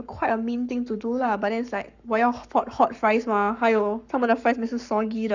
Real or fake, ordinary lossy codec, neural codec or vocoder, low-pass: fake; none; codec, 44.1 kHz, 7.8 kbps, Pupu-Codec; 7.2 kHz